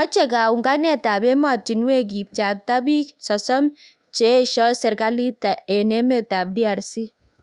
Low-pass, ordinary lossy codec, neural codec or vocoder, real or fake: 10.8 kHz; Opus, 64 kbps; codec, 24 kHz, 1.2 kbps, DualCodec; fake